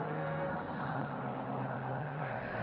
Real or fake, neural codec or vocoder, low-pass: fake; codec, 16 kHz in and 24 kHz out, 0.9 kbps, LongCat-Audio-Codec, four codebook decoder; 5.4 kHz